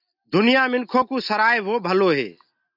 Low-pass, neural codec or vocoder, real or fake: 5.4 kHz; none; real